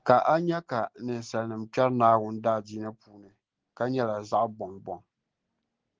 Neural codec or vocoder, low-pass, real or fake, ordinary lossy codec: none; 7.2 kHz; real; Opus, 16 kbps